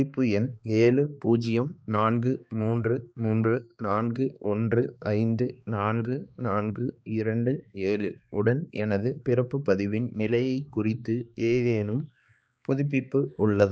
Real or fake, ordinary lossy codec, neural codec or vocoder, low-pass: fake; none; codec, 16 kHz, 2 kbps, X-Codec, HuBERT features, trained on balanced general audio; none